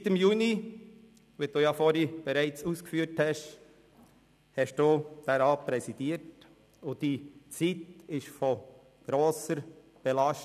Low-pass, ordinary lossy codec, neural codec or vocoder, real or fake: 14.4 kHz; none; none; real